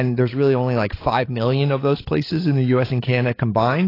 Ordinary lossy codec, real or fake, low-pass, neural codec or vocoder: AAC, 24 kbps; fake; 5.4 kHz; codec, 16 kHz, 16 kbps, FreqCodec, larger model